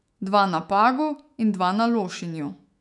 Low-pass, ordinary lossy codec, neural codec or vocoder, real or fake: 10.8 kHz; none; autoencoder, 48 kHz, 128 numbers a frame, DAC-VAE, trained on Japanese speech; fake